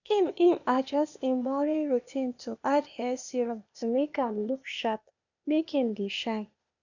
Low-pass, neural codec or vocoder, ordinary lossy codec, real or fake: 7.2 kHz; codec, 16 kHz, 0.8 kbps, ZipCodec; none; fake